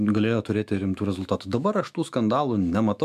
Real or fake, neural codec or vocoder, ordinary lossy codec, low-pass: fake; autoencoder, 48 kHz, 128 numbers a frame, DAC-VAE, trained on Japanese speech; MP3, 96 kbps; 14.4 kHz